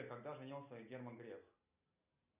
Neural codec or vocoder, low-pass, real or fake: none; 3.6 kHz; real